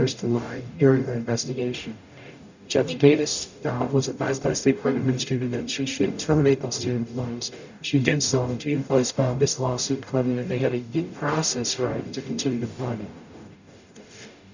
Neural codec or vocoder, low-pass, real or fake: codec, 44.1 kHz, 0.9 kbps, DAC; 7.2 kHz; fake